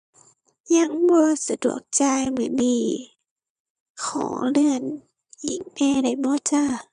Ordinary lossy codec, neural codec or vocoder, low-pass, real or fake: none; vocoder, 22.05 kHz, 80 mel bands, Vocos; 9.9 kHz; fake